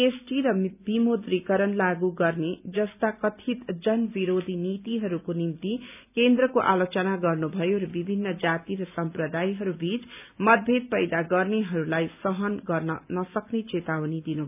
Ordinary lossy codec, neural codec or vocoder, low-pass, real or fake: none; none; 3.6 kHz; real